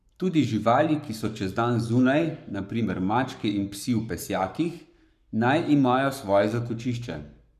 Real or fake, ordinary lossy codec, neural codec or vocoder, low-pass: fake; none; codec, 44.1 kHz, 7.8 kbps, Pupu-Codec; 14.4 kHz